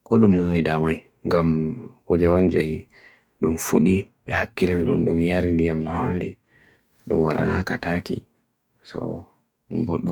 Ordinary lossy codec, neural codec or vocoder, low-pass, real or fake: none; codec, 44.1 kHz, 2.6 kbps, DAC; 19.8 kHz; fake